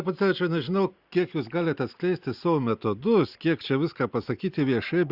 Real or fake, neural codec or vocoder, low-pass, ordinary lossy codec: real; none; 5.4 kHz; Opus, 64 kbps